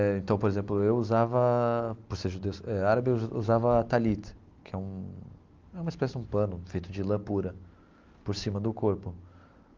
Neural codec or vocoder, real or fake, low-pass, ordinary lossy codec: none; real; 7.2 kHz; Opus, 32 kbps